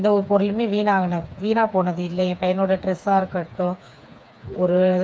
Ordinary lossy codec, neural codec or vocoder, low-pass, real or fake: none; codec, 16 kHz, 4 kbps, FreqCodec, smaller model; none; fake